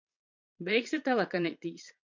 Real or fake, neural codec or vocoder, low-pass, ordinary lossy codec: fake; vocoder, 22.05 kHz, 80 mel bands, Vocos; 7.2 kHz; MP3, 48 kbps